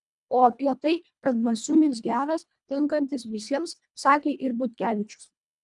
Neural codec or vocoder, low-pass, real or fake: codec, 24 kHz, 1.5 kbps, HILCodec; 10.8 kHz; fake